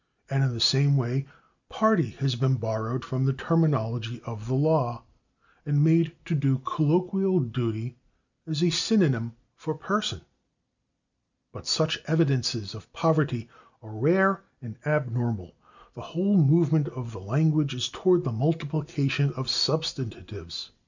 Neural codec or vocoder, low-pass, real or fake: none; 7.2 kHz; real